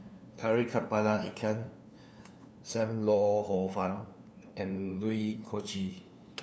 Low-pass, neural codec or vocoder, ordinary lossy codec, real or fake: none; codec, 16 kHz, 2 kbps, FunCodec, trained on LibriTTS, 25 frames a second; none; fake